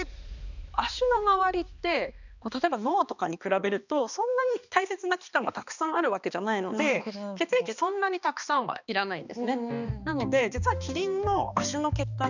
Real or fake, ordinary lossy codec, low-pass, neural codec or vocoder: fake; none; 7.2 kHz; codec, 16 kHz, 2 kbps, X-Codec, HuBERT features, trained on balanced general audio